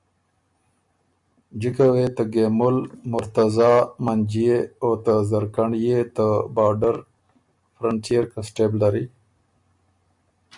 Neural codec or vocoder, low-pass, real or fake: none; 10.8 kHz; real